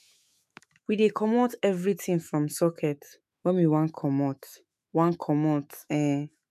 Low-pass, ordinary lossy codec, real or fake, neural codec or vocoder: 14.4 kHz; MP3, 96 kbps; fake; autoencoder, 48 kHz, 128 numbers a frame, DAC-VAE, trained on Japanese speech